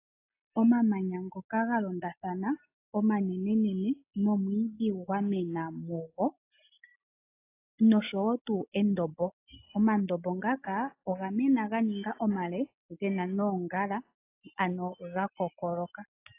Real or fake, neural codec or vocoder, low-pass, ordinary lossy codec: real; none; 3.6 kHz; AAC, 24 kbps